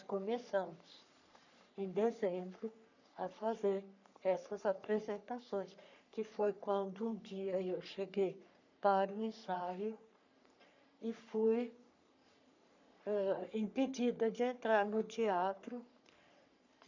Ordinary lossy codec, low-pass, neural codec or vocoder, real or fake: none; 7.2 kHz; codec, 44.1 kHz, 3.4 kbps, Pupu-Codec; fake